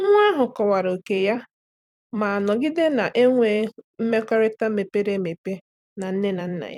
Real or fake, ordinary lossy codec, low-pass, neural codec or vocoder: fake; none; 19.8 kHz; vocoder, 44.1 kHz, 128 mel bands every 256 samples, BigVGAN v2